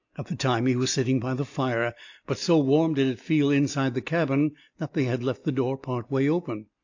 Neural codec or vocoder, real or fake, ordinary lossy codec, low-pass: none; real; AAC, 48 kbps; 7.2 kHz